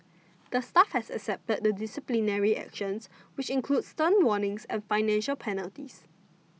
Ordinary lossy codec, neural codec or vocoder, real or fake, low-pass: none; none; real; none